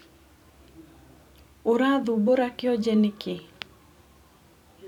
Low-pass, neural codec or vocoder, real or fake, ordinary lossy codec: 19.8 kHz; vocoder, 44.1 kHz, 128 mel bands every 256 samples, BigVGAN v2; fake; none